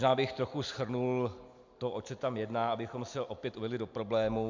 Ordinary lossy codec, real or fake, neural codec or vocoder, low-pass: MP3, 64 kbps; real; none; 7.2 kHz